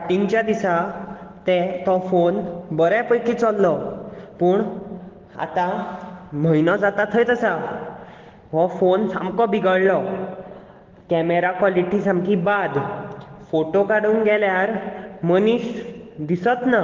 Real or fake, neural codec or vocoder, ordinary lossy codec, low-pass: real; none; Opus, 16 kbps; 7.2 kHz